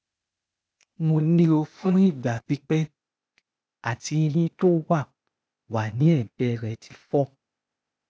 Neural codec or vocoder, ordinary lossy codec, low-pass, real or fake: codec, 16 kHz, 0.8 kbps, ZipCodec; none; none; fake